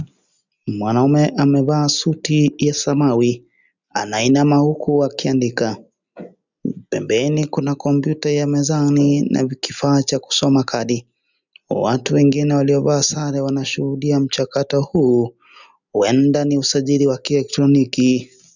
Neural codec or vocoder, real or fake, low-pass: none; real; 7.2 kHz